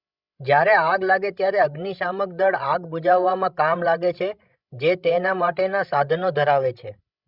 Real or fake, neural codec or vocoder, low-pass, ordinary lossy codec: fake; codec, 16 kHz, 16 kbps, FreqCodec, larger model; 5.4 kHz; Opus, 64 kbps